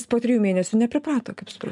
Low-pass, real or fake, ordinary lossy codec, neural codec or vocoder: 10.8 kHz; real; MP3, 96 kbps; none